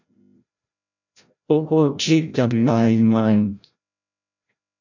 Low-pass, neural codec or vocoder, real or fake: 7.2 kHz; codec, 16 kHz, 0.5 kbps, FreqCodec, larger model; fake